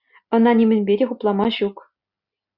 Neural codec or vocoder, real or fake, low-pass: none; real; 5.4 kHz